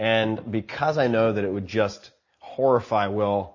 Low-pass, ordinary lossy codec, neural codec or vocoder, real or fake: 7.2 kHz; MP3, 32 kbps; none; real